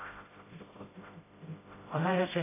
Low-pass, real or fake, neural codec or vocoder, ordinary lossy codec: 3.6 kHz; fake; codec, 16 kHz, 0.5 kbps, FreqCodec, smaller model; AAC, 16 kbps